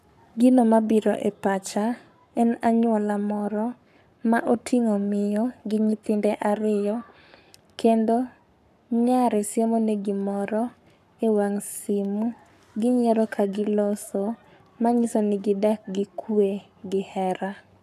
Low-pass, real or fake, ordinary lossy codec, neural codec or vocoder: 14.4 kHz; fake; none; codec, 44.1 kHz, 7.8 kbps, Pupu-Codec